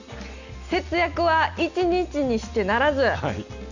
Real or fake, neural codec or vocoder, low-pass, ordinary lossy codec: real; none; 7.2 kHz; none